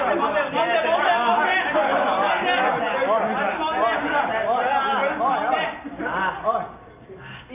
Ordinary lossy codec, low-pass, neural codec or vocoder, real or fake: none; 3.6 kHz; none; real